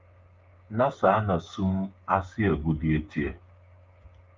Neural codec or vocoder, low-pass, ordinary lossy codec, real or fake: codec, 16 kHz, 8 kbps, FreqCodec, smaller model; 7.2 kHz; Opus, 24 kbps; fake